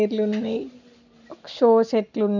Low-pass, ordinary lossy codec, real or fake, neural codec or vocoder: 7.2 kHz; none; real; none